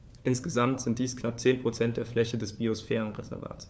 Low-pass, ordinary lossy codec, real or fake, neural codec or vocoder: none; none; fake; codec, 16 kHz, 4 kbps, FunCodec, trained on LibriTTS, 50 frames a second